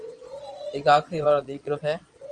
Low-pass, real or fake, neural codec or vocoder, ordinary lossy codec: 9.9 kHz; fake; vocoder, 22.05 kHz, 80 mel bands, Vocos; Opus, 32 kbps